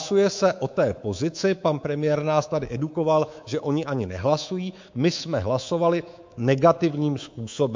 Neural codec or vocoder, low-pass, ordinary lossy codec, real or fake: codec, 24 kHz, 3.1 kbps, DualCodec; 7.2 kHz; MP3, 48 kbps; fake